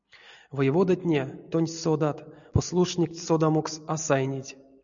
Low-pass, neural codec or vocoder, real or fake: 7.2 kHz; none; real